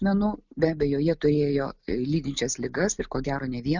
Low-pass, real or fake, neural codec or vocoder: 7.2 kHz; real; none